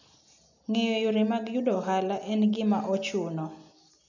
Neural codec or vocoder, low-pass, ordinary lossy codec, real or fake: none; 7.2 kHz; none; real